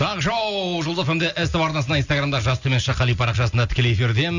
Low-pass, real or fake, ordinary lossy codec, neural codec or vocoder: 7.2 kHz; real; none; none